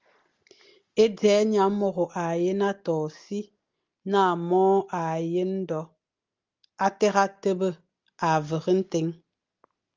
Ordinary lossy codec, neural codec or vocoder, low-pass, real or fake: Opus, 32 kbps; none; 7.2 kHz; real